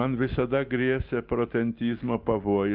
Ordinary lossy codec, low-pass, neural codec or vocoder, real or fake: Opus, 32 kbps; 5.4 kHz; none; real